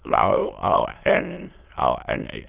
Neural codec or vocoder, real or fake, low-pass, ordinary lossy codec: autoencoder, 22.05 kHz, a latent of 192 numbers a frame, VITS, trained on many speakers; fake; 3.6 kHz; Opus, 24 kbps